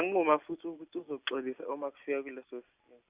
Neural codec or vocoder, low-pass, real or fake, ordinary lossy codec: none; 3.6 kHz; real; Opus, 64 kbps